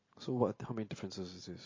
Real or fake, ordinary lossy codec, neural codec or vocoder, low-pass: real; MP3, 32 kbps; none; 7.2 kHz